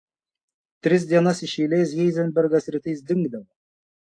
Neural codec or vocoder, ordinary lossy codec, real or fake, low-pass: none; AAC, 48 kbps; real; 9.9 kHz